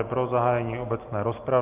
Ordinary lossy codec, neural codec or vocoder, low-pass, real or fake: Opus, 32 kbps; none; 3.6 kHz; real